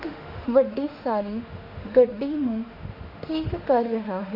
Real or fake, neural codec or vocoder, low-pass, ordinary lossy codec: fake; autoencoder, 48 kHz, 32 numbers a frame, DAC-VAE, trained on Japanese speech; 5.4 kHz; none